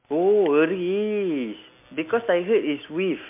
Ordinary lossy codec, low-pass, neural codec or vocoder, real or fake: MP3, 32 kbps; 3.6 kHz; none; real